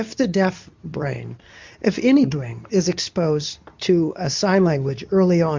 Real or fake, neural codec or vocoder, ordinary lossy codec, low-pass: fake; codec, 24 kHz, 0.9 kbps, WavTokenizer, medium speech release version 2; MP3, 64 kbps; 7.2 kHz